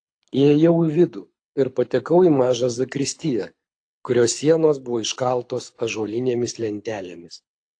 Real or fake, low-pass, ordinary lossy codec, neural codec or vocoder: fake; 9.9 kHz; AAC, 48 kbps; codec, 24 kHz, 6 kbps, HILCodec